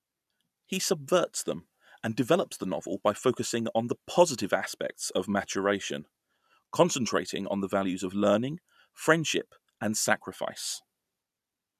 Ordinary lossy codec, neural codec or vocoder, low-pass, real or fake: none; none; 14.4 kHz; real